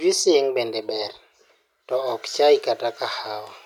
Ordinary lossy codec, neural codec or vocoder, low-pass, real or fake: none; none; 19.8 kHz; real